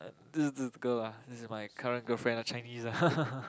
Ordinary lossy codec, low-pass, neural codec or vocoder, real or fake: none; none; none; real